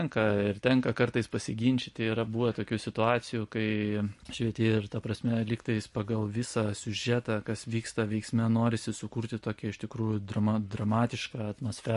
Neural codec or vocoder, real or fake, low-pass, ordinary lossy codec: vocoder, 44.1 kHz, 128 mel bands every 256 samples, BigVGAN v2; fake; 14.4 kHz; MP3, 48 kbps